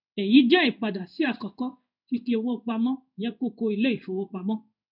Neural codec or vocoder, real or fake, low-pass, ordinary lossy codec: codec, 16 kHz in and 24 kHz out, 1 kbps, XY-Tokenizer; fake; 5.4 kHz; none